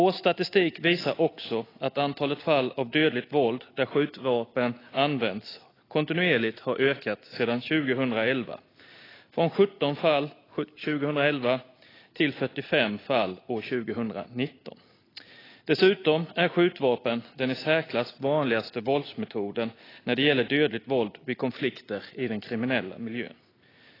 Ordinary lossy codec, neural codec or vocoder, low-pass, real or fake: AAC, 24 kbps; none; 5.4 kHz; real